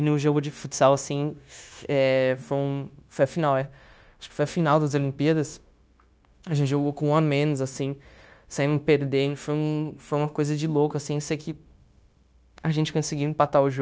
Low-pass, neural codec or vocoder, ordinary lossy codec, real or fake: none; codec, 16 kHz, 0.9 kbps, LongCat-Audio-Codec; none; fake